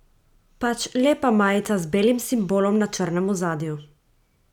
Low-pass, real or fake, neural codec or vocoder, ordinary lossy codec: 19.8 kHz; real; none; none